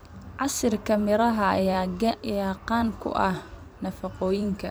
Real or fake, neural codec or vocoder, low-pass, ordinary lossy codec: fake; vocoder, 44.1 kHz, 128 mel bands every 256 samples, BigVGAN v2; none; none